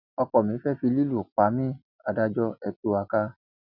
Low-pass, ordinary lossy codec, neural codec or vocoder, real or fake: 5.4 kHz; none; none; real